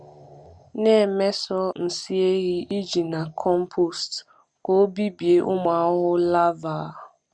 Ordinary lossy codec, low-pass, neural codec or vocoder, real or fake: Opus, 64 kbps; 9.9 kHz; none; real